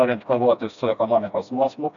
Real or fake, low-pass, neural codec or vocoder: fake; 7.2 kHz; codec, 16 kHz, 1 kbps, FreqCodec, smaller model